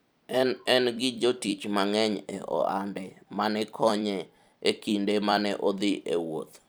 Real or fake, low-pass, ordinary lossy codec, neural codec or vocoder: fake; none; none; vocoder, 44.1 kHz, 128 mel bands every 256 samples, BigVGAN v2